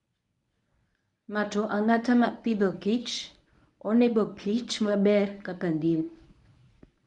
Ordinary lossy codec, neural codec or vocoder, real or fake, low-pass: none; codec, 24 kHz, 0.9 kbps, WavTokenizer, medium speech release version 1; fake; 10.8 kHz